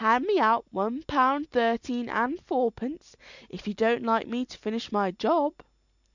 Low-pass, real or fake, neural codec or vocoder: 7.2 kHz; real; none